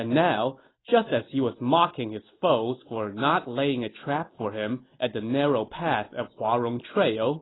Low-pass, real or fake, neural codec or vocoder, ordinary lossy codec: 7.2 kHz; real; none; AAC, 16 kbps